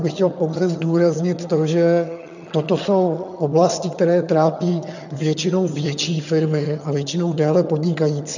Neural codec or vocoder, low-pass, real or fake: vocoder, 22.05 kHz, 80 mel bands, HiFi-GAN; 7.2 kHz; fake